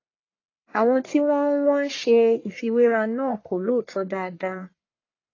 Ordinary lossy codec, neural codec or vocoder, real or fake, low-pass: AAC, 32 kbps; codec, 44.1 kHz, 1.7 kbps, Pupu-Codec; fake; 7.2 kHz